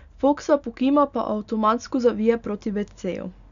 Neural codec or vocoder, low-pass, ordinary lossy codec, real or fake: none; 7.2 kHz; none; real